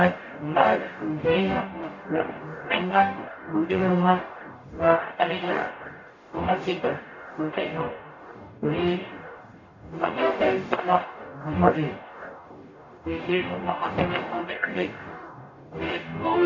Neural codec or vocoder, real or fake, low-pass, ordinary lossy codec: codec, 44.1 kHz, 0.9 kbps, DAC; fake; 7.2 kHz; none